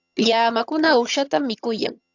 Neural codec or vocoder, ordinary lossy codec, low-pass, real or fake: vocoder, 22.05 kHz, 80 mel bands, HiFi-GAN; AAC, 48 kbps; 7.2 kHz; fake